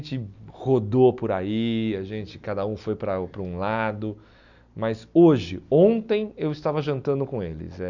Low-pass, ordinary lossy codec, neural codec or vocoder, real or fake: 7.2 kHz; none; none; real